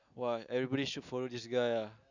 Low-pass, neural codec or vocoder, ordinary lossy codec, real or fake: 7.2 kHz; none; none; real